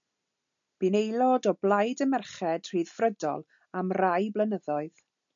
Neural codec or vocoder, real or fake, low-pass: none; real; 7.2 kHz